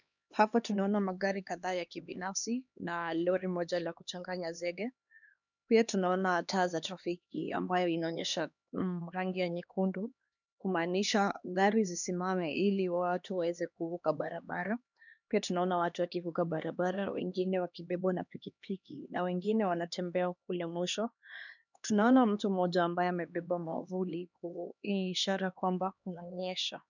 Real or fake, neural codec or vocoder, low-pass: fake; codec, 16 kHz, 2 kbps, X-Codec, HuBERT features, trained on LibriSpeech; 7.2 kHz